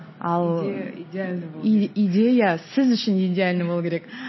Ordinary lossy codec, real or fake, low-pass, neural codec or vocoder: MP3, 24 kbps; real; 7.2 kHz; none